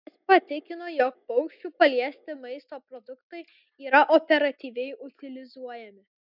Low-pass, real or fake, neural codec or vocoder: 5.4 kHz; real; none